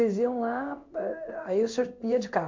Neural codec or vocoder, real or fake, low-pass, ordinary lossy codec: codec, 16 kHz in and 24 kHz out, 1 kbps, XY-Tokenizer; fake; 7.2 kHz; MP3, 48 kbps